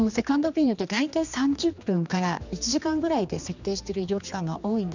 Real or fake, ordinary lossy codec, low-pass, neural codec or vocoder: fake; none; 7.2 kHz; codec, 16 kHz, 2 kbps, X-Codec, HuBERT features, trained on general audio